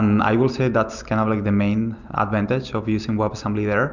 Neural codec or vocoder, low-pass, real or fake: none; 7.2 kHz; real